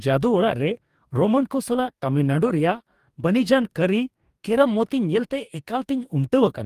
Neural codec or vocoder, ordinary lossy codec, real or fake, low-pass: codec, 44.1 kHz, 2.6 kbps, DAC; Opus, 32 kbps; fake; 14.4 kHz